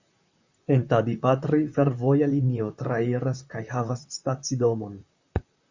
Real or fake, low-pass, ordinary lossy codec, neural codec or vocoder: fake; 7.2 kHz; Opus, 64 kbps; vocoder, 44.1 kHz, 128 mel bands, Pupu-Vocoder